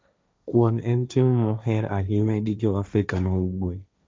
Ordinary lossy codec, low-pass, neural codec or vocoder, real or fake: none; none; codec, 16 kHz, 1.1 kbps, Voila-Tokenizer; fake